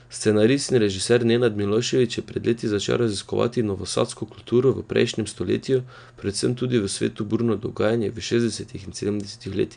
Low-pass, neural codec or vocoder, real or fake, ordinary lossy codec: 9.9 kHz; none; real; none